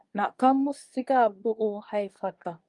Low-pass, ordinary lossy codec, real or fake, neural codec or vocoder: 10.8 kHz; Opus, 32 kbps; fake; codec, 24 kHz, 1 kbps, SNAC